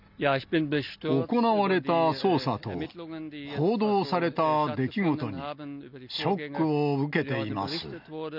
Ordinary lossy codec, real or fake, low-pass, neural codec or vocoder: none; real; 5.4 kHz; none